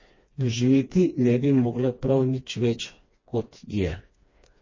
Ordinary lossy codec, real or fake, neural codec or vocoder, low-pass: MP3, 32 kbps; fake; codec, 16 kHz, 2 kbps, FreqCodec, smaller model; 7.2 kHz